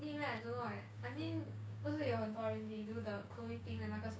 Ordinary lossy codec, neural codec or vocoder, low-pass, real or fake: none; codec, 16 kHz, 6 kbps, DAC; none; fake